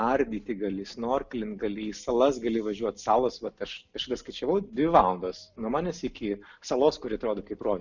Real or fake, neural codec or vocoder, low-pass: real; none; 7.2 kHz